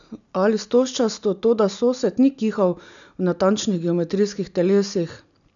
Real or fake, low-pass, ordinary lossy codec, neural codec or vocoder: real; 7.2 kHz; none; none